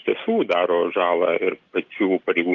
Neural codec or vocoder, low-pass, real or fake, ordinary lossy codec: none; 7.2 kHz; real; Opus, 24 kbps